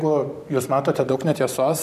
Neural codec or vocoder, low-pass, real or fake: vocoder, 44.1 kHz, 128 mel bands every 512 samples, BigVGAN v2; 14.4 kHz; fake